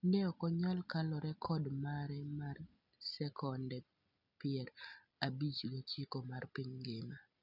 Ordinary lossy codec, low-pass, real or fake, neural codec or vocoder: none; 5.4 kHz; real; none